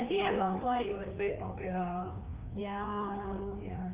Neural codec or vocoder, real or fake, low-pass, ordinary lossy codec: codec, 16 kHz, 2 kbps, FreqCodec, larger model; fake; 3.6 kHz; Opus, 32 kbps